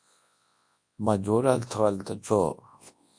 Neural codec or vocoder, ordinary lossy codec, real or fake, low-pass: codec, 24 kHz, 0.9 kbps, WavTokenizer, large speech release; AAC, 64 kbps; fake; 9.9 kHz